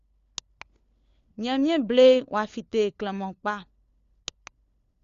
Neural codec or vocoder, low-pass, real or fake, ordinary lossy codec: codec, 16 kHz, 4 kbps, FunCodec, trained on LibriTTS, 50 frames a second; 7.2 kHz; fake; Opus, 64 kbps